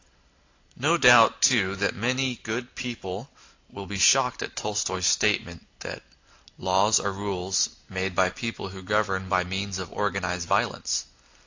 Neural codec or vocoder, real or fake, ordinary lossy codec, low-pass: none; real; AAC, 48 kbps; 7.2 kHz